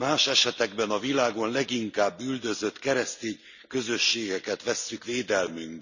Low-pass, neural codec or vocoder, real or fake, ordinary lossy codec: 7.2 kHz; none; real; none